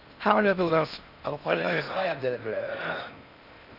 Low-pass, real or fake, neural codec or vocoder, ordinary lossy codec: 5.4 kHz; fake; codec, 16 kHz in and 24 kHz out, 0.6 kbps, FocalCodec, streaming, 4096 codes; none